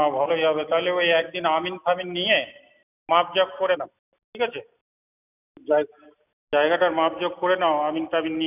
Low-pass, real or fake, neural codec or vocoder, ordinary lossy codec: 3.6 kHz; real; none; none